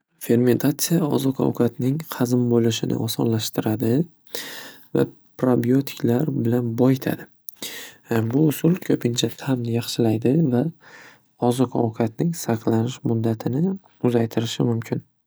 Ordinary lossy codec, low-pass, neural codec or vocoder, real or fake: none; none; none; real